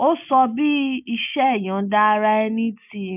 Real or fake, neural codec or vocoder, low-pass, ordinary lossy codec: real; none; 3.6 kHz; none